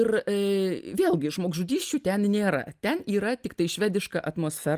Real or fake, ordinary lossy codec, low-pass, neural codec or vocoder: real; Opus, 32 kbps; 14.4 kHz; none